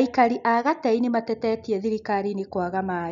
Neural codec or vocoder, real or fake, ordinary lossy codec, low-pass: none; real; none; 7.2 kHz